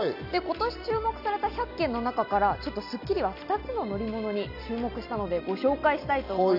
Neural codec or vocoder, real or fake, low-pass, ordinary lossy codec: none; real; 5.4 kHz; none